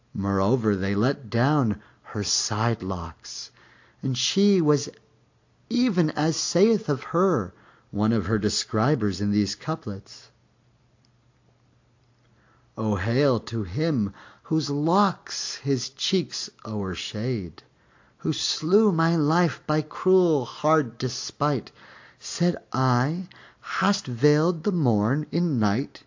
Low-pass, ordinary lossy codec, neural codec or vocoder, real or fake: 7.2 kHz; AAC, 48 kbps; none; real